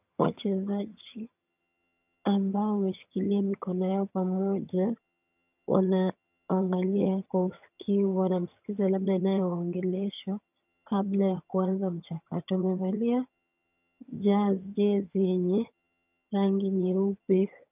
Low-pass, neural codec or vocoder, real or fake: 3.6 kHz; vocoder, 22.05 kHz, 80 mel bands, HiFi-GAN; fake